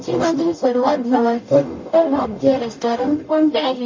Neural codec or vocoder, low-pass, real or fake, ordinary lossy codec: codec, 44.1 kHz, 0.9 kbps, DAC; 7.2 kHz; fake; MP3, 32 kbps